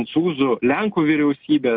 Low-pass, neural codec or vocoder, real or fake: 5.4 kHz; none; real